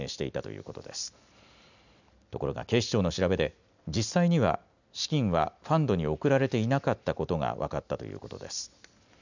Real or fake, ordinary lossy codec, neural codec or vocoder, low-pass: real; none; none; 7.2 kHz